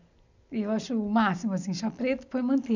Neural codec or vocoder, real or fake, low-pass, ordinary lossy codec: none; real; 7.2 kHz; none